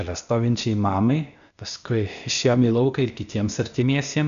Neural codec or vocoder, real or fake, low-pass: codec, 16 kHz, 0.8 kbps, ZipCodec; fake; 7.2 kHz